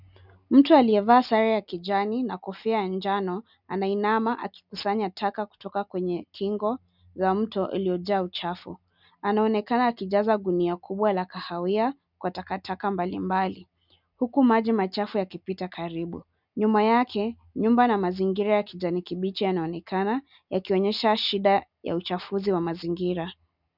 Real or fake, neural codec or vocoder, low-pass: real; none; 5.4 kHz